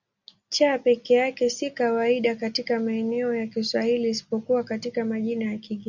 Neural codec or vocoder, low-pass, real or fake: none; 7.2 kHz; real